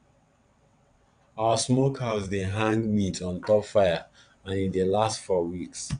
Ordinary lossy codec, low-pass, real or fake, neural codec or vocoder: none; 9.9 kHz; fake; vocoder, 22.05 kHz, 80 mel bands, WaveNeXt